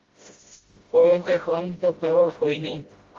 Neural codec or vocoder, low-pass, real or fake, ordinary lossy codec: codec, 16 kHz, 0.5 kbps, FreqCodec, smaller model; 7.2 kHz; fake; Opus, 32 kbps